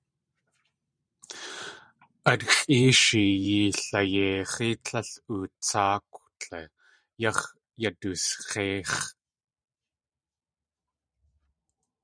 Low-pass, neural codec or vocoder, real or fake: 9.9 kHz; vocoder, 44.1 kHz, 128 mel bands every 512 samples, BigVGAN v2; fake